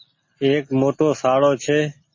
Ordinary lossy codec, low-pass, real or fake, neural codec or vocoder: MP3, 32 kbps; 7.2 kHz; real; none